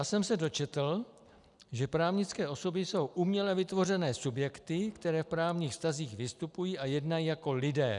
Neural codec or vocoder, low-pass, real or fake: none; 10.8 kHz; real